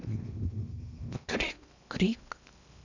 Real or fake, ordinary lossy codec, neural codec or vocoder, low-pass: fake; none; codec, 16 kHz in and 24 kHz out, 0.8 kbps, FocalCodec, streaming, 65536 codes; 7.2 kHz